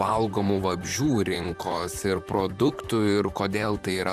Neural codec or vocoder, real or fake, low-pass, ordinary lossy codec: vocoder, 44.1 kHz, 128 mel bands, Pupu-Vocoder; fake; 14.4 kHz; MP3, 96 kbps